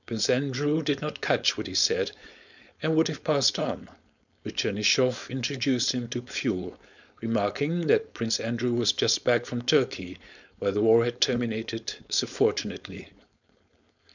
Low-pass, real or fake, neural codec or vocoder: 7.2 kHz; fake; codec, 16 kHz, 4.8 kbps, FACodec